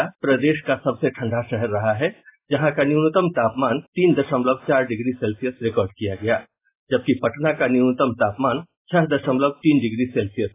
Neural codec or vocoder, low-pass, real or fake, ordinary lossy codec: none; 3.6 kHz; real; AAC, 24 kbps